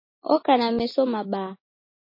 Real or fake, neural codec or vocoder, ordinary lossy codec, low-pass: real; none; MP3, 24 kbps; 5.4 kHz